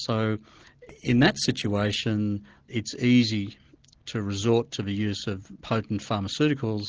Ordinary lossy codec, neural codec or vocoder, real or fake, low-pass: Opus, 16 kbps; none; real; 7.2 kHz